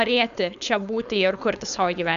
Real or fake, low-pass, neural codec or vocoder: fake; 7.2 kHz; codec, 16 kHz, 4.8 kbps, FACodec